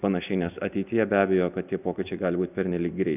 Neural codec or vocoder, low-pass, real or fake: none; 3.6 kHz; real